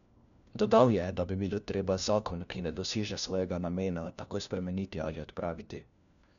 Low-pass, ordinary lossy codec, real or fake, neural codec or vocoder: 7.2 kHz; none; fake; codec, 16 kHz, 1 kbps, FunCodec, trained on LibriTTS, 50 frames a second